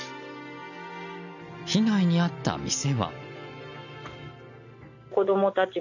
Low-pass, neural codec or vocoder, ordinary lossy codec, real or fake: 7.2 kHz; none; none; real